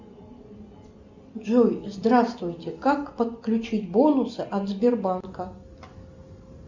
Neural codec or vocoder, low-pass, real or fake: none; 7.2 kHz; real